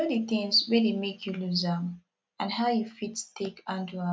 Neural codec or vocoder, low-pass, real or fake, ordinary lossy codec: none; none; real; none